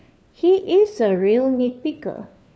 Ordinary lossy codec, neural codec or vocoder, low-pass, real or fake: none; codec, 16 kHz, 4 kbps, FunCodec, trained on LibriTTS, 50 frames a second; none; fake